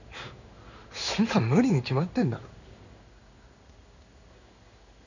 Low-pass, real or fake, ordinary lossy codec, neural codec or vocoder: 7.2 kHz; fake; none; codec, 16 kHz in and 24 kHz out, 1 kbps, XY-Tokenizer